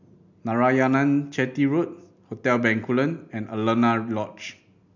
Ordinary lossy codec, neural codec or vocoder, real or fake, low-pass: none; none; real; 7.2 kHz